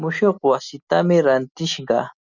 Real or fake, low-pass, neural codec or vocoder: real; 7.2 kHz; none